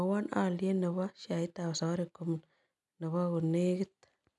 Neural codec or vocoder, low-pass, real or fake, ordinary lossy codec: none; none; real; none